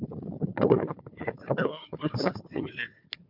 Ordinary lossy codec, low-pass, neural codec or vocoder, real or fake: MP3, 48 kbps; 5.4 kHz; codec, 16 kHz, 4 kbps, FunCodec, trained on Chinese and English, 50 frames a second; fake